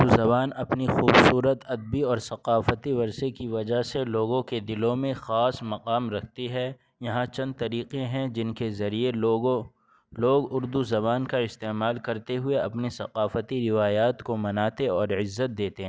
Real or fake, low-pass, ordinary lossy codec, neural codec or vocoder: real; none; none; none